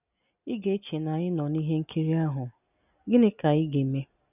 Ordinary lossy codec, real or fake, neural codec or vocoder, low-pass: none; real; none; 3.6 kHz